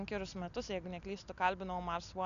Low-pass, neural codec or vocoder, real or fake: 7.2 kHz; none; real